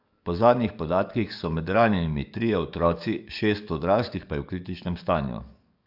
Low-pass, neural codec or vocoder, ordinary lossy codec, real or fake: 5.4 kHz; codec, 44.1 kHz, 7.8 kbps, DAC; none; fake